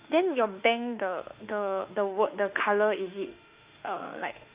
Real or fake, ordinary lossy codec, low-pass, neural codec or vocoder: fake; Opus, 64 kbps; 3.6 kHz; autoencoder, 48 kHz, 32 numbers a frame, DAC-VAE, trained on Japanese speech